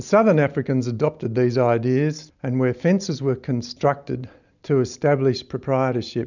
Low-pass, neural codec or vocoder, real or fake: 7.2 kHz; none; real